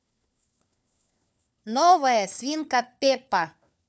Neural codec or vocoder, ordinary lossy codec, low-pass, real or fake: codec, 16 kHz, 4 kbps, FunCodec, trained on LibriTTS, 50 frames a second; none; none; fake